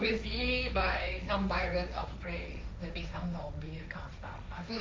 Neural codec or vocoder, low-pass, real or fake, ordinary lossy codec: codec, 16 kHz, 1.1 kbps, Voila-Tokenizer; none; fake; none